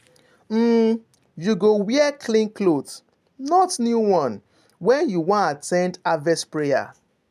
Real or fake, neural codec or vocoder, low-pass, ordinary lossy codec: real; none; 14.4 kHz; none